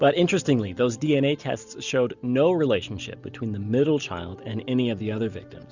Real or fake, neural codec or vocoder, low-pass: real; none; 7.2 kHz